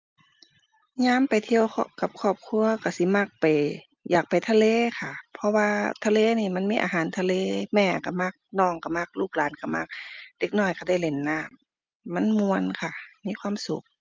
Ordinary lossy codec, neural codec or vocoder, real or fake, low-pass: Opus, 24 kbps; none; real; 7.2 kHz